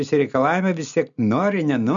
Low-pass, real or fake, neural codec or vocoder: 7.2 kHz; real; none